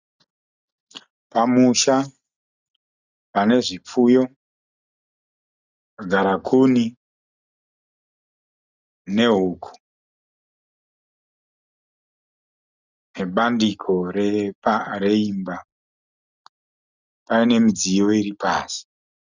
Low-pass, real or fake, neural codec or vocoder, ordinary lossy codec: 7.2 kHz; real; none; Opus, 64 kbps